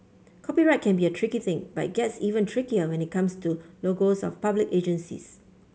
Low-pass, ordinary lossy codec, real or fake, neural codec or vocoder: none; none; real; none